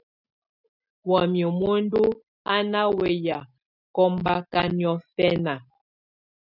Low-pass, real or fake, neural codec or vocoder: 5.4 kHz; real; none